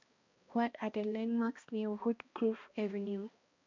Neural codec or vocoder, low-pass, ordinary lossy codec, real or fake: codec, 16 kHz, 1 kbps, X-Codec, HuBERT features, trained on balanced general audio; 7.2 kHz; MP3, 48 kbps; fake